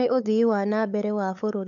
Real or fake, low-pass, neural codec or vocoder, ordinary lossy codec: fake; 7.2 kHz; codec, 16 kHz, 8 kbps, FunCodec, trained on LibriTTS, 25 frames a second; none